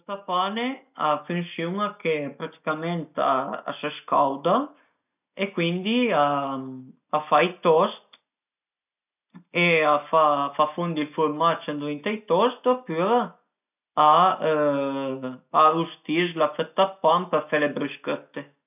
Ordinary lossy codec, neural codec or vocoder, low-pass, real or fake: none; none; 3.6 kHz; real